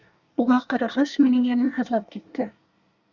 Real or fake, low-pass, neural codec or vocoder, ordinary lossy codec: fake; 7.2 kHz; codec, 24 kHz, 1 kbps, SNAC; Opus, 64 kbps